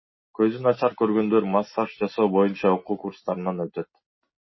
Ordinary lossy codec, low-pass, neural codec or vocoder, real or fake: MP3, 24 kbps; 7.2 kHz; none; real